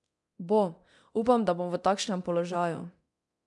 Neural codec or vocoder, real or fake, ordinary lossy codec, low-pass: codec, 24 kHz, 0.9 kbps, DualCodec; fake; none; 10.8 kHz